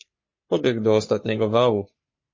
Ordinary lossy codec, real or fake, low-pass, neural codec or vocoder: MP3, 32 kbps; fake; 7.2 kHz; codec, 44.1 kHz, 3.4 kbps, Pupu-Codec